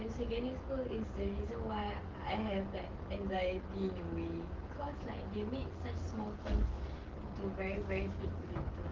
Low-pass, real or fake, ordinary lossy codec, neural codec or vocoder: 7.2 kHz; fake; Opus, 16 kbps; codec, 16 kHz, 16 kbps, FreqCodec, smaller model